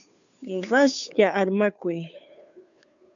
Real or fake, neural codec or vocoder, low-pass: fake; codec, 16 kHz, 2 kbps, FunCodec, trained on Chinese and English, 25 frames a second; 7.2 kHz